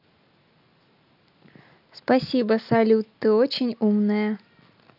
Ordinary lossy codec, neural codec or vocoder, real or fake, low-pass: none; none; real; 5.4 kHz